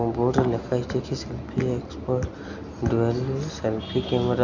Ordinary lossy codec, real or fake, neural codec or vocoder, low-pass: none; real; none; 7.2 kHz